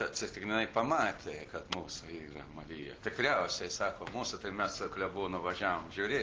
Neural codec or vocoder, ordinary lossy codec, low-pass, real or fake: none; Opus, 16 kbps; 7.2 kHz; real